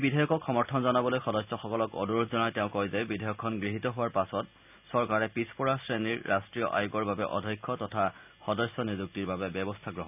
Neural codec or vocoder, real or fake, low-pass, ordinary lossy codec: none; real; 3.6 kHz; none